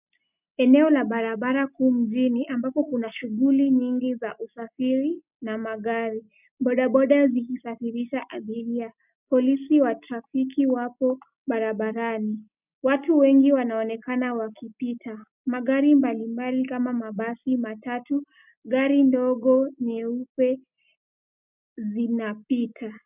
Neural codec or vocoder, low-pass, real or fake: none; 3.6 kHz; real